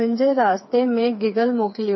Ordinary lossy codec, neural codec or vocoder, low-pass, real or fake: MP3, 24 kbps; codec, 16 kHz, 4 kbps, FreqCodec, smaller model; 7.2 kHz; fake